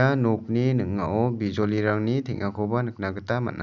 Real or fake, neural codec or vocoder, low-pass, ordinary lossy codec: real; none; 7.2 kHz; none